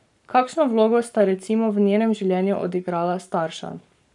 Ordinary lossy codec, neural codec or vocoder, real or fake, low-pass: none; codec, 44.1 kHz, 7.8 kbps, Pupu-Codec; fake; 10.8 kHz